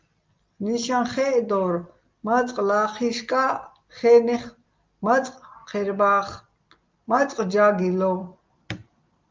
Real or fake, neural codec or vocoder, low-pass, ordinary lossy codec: real; none; 7.2 kHz; Opus, 24 kbps